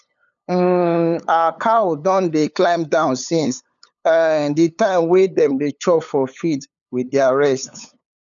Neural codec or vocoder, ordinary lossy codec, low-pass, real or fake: codec, 16 kHz, 8 kbps, FunCodec, trained on LibriTTS, 25 frames a second; none; 7.2 kHz; fake